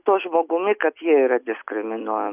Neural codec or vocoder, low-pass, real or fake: none; 3.6 kHz; real